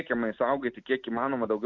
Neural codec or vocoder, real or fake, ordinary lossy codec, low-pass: none; real; Opus, 64 kbps; 7.2 kHz